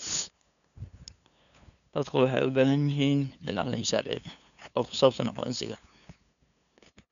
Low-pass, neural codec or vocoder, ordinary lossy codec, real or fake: 7.2 kHz; codec, 16 kHz, 2 kbps, FunCodec, trained on LibriTTS, 25 frames a second; none; fake